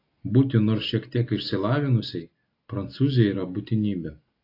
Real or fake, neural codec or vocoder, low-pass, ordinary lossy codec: real; none; 5.4 kHz; AAC, 32 kbps